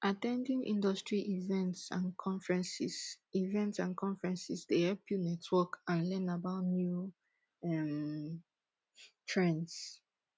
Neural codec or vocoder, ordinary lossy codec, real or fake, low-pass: none; none; real; none